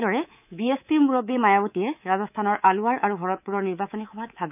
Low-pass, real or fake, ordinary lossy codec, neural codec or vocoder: 3.6 kHz; fake; none; codec, 24 kHz, 3.1 kbps, DualCodec